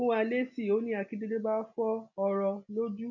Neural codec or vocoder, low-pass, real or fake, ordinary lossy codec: none; 7.2 kHz; real; none